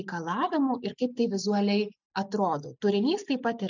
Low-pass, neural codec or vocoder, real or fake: 7.2 kHz; none; real